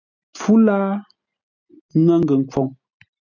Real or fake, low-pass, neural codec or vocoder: real; 7.2 kHz; none